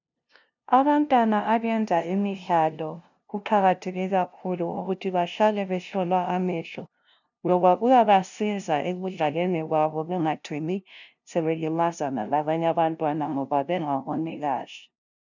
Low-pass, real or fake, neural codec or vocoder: 7.2 kHz; fake; codec, 16 kHz, 0.5 kbps, FunCodec, trained on LibriTTS, 25 frames a second